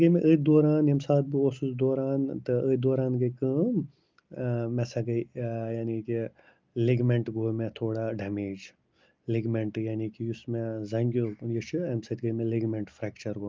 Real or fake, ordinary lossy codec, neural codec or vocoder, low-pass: real; Opus, 32 kbps; none; 7.2 kHz